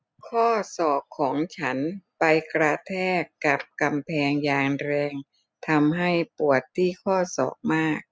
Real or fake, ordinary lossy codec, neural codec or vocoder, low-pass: real; none; none; none